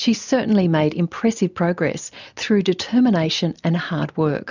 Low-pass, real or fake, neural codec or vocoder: 7.2 kHz; real; none